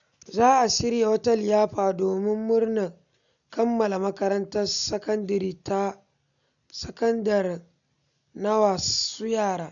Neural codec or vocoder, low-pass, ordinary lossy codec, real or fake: none; 7.2 kHz; none; real